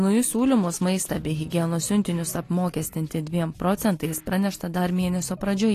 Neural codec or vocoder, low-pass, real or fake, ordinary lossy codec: none; 14.4 kHz; real; AAC, 48 kbps